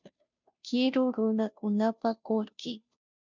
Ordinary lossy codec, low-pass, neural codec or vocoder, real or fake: MP3, 64 kbps; 7.2 kHz; codec, 16 kHz, 0.5 kbps, FunCodec, trained on Chinese and English, 25 frames a second; fake